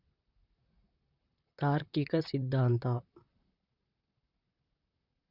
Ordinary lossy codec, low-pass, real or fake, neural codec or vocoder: Opus, 64 kbps; 5.4 kHz; fake; vocoder, 44.1 kHz, 128 mel bands, Pupu-Vocoder